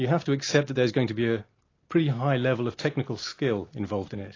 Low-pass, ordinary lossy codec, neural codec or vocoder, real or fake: 7.2 kHz; AAC, 32 kbps; none; real